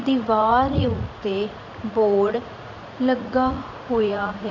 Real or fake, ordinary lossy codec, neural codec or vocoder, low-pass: fake; none; vocoder, 44.1 kHz, 80 mel bands, Vocos; 7.2 kHz